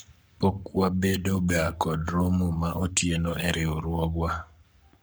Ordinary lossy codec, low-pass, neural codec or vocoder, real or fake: none; none; codec, 44.1 kHz, 7.8 kbps, Pupu-Codec; fake